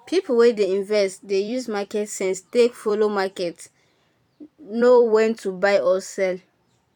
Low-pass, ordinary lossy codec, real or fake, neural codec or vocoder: 19.8 kHz; none; fake; vocoder, 44.1 kHz, 128 mel bands every 256 samples, BigVGAN v2